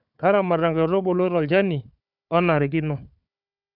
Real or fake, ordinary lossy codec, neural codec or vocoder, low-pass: fake; none; codec, 16 kHz, 4 kbps, FunCodec, trained on Chinese and English, 50 frames a second; 5.4 kHz